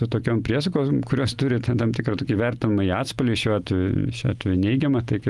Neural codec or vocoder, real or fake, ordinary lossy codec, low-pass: none; real; Opus, 24 kbps; 10.8 kHz